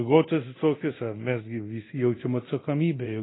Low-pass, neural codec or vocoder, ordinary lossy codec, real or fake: 7.2 kHz; codec, 24 kHz, 0.9 kbps, DualCodec; AAC, 16 kbps; fake